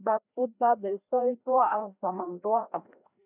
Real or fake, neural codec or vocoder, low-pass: fake; codec, 16 kHz, 1 kbps, FreqCodec, larger model; 3.6 kHz